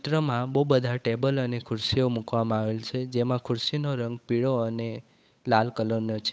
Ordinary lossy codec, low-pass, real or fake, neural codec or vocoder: none; none; fake; codec, 16 kHz, 8 kbps, FunCodec, trained on Chinese and English, 25 frames a second